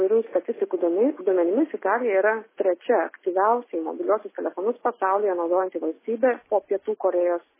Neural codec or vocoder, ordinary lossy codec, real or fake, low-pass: none; MP3, 16 kbps; real; 3.6 kHz